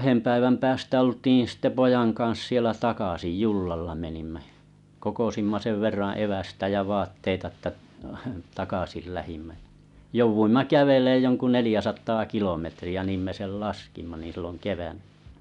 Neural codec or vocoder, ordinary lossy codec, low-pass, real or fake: none; none; 10.8 kHz; real